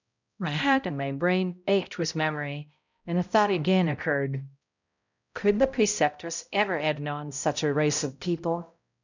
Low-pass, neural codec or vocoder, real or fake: 7.2 kHz; codec, 16 kHz, 0.5 kbps, X-Codec, HuBERT features, trained on balanced general audio; fake